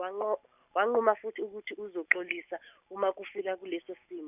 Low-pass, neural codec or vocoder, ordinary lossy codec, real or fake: 3.6 kHz; none; none; real